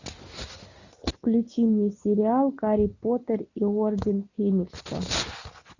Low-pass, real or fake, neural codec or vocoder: 7.2 kHz; real; none